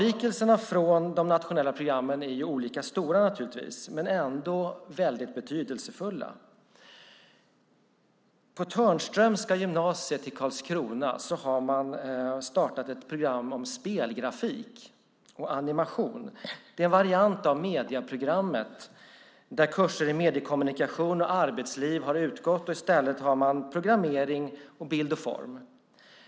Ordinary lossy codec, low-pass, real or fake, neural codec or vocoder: none; none; real; none